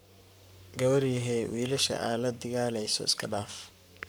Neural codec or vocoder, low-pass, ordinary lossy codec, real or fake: codec, 44.1 kHz, 7.8 kbps, Pupu-Codec; none; none; fake